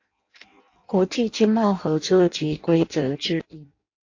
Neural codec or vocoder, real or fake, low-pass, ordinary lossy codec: codec, 16 kHz in and 24 kHz out, 0.6 kbps, FireRedTTS-2 codec; fake; 7.2 kHz; AAC, 48 kbps